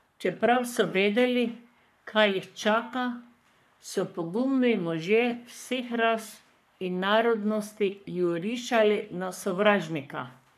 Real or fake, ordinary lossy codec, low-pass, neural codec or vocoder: fake; none; 14.4 kHz; codec, 44.1 kHz, 3.4 kbps, Pupu-Codec